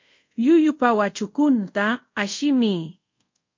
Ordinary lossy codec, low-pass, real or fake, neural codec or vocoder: MP3, 48 kbps; 7.2 kHz; fake; codec, 24 kHz, 0.5 kbps, DualCodec